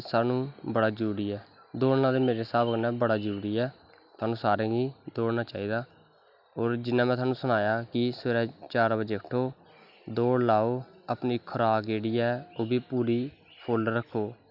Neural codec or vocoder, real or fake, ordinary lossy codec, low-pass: none; real; none; 5.4 kHz